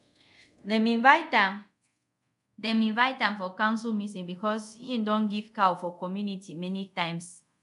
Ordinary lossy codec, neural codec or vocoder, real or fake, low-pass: none; codec, 24 kHz, 0.5 kbps, DualCodec; fake; 10.8 kHz